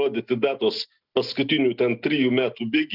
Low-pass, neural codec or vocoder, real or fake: 5.4 kHz; none; real